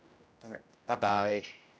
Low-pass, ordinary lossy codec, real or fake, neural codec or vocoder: none; none; fake; codec, 16 kHz, 0.5 kbps, X-Codec, HuBERT features, trained on general audio